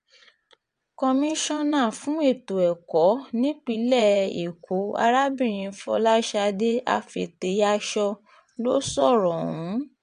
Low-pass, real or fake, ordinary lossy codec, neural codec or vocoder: 9.9 kHz; fake; MP3, 64 kbps; vocoder, 22.05 kHz, 80 mel bands, WaveNeXt